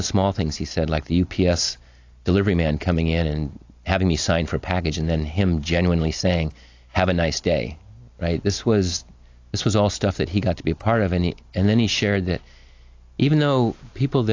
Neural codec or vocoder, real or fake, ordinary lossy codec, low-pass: none; real; AAC, 48 kbps; 7.2 kHz